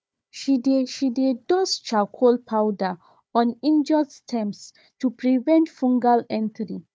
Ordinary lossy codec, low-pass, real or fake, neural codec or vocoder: none; none; fake; codec, 16 kHz, 4 kbps, FunCodec, trained on Chinese and English, 50 frames a second